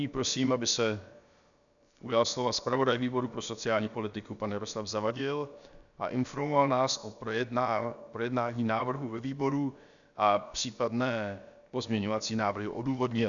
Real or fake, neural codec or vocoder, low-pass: fake; codec, 16 kHz, about 1 kbps, DyCAST, with the encoder's durations; 7.2 kHz